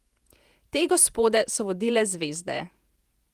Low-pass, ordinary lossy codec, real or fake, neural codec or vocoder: 14.4 kHz; Opus, 32 kbps; fake; vocoder, 48 kHz, 128 mel bands, Vocos